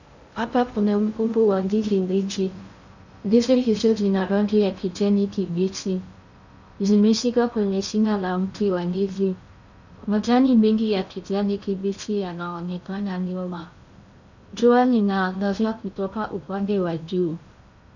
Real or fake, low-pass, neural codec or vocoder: fake; 7.2 kHz; codec, 16 kHz in and 24 kHz out, 0.6 kbps, FocalCodec, streaming, 2048 codes